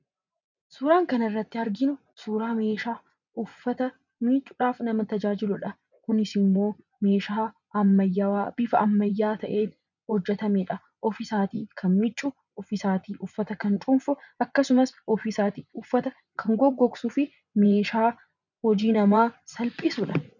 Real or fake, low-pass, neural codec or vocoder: fake; 7.2 kHz; vocoder, 24 kHz, 100 mel bands, Vocos